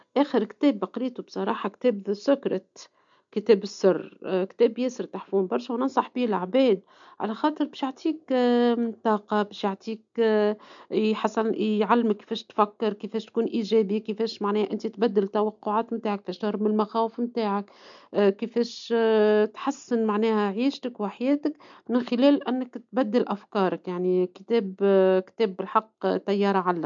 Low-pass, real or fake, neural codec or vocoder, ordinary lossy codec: 7.2 kHz; real; none; MP3, 64 kbps